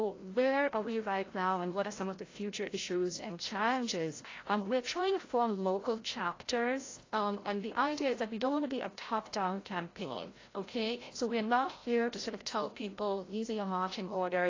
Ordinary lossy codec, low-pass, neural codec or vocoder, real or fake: AAC, 32 kbps; 7.2 kHz; codec, 16 kHz, 0.5 kbps, FreqCodec, larger model; fake